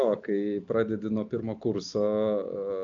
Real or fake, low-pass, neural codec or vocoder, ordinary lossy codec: real; 7.2 kHz; none; AAC, 64 kbps